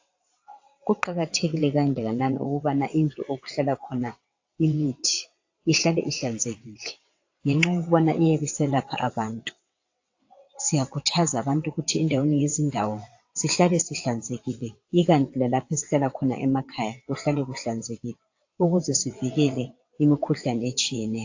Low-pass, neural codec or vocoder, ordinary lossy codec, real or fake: 7.2 kHz; vocoder, 22.05 kHz, 80 mel bands, WaveNeXt; AAC, 48 kbps; fake